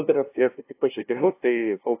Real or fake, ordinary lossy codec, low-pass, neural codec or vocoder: fake; AAC, 24 kbps; 3.6 kHz; codec, 16 kHz, 0.5 kbps, FunCodec, trained on LibriTTS, 25 frames a second